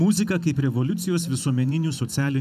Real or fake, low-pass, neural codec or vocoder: fake; 14.4 kHz; vocoder, 44.1 kHz, 128 mel bands every 512 samples, BigVGAN v2